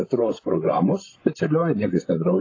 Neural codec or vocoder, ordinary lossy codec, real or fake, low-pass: codec, 16 kHz, 16 kbps, FreqCodec, larger model; AAC, 32 kbps; fake; 7.2 kHz